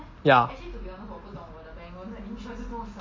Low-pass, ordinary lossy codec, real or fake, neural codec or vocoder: 7.2 kHz; MP3, 32 kbps; real; none